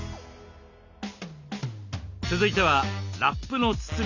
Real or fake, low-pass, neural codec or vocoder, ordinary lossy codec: real; 7.2 kHz; none; none